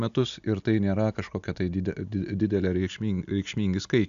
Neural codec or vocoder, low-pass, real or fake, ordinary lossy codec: none; 7.2 kHz; real; AAC, 96 kbps